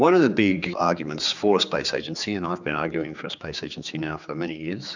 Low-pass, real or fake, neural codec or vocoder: 7.2 kHz; fake; codec, 16 kHz, 4 kbps, X-Codec, HuBERT features, trained on general audio